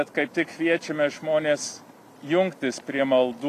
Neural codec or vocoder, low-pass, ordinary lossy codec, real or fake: none; 14.4 kHz; AAC, 96 kbps; real